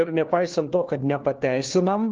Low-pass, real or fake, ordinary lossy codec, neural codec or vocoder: 7.2 kHz; fake; Opus, 16 kbps; codec, 16 kHz, 1 kbps, FunCodec, trained on LibriTTS, 50 frames a second